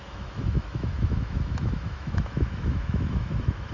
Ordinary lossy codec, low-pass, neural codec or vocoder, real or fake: none; 7.2 kHz; autoencoder, 48 kHz, 128 numbers a frame, DAC-VAE, trained on Japanese speech; fake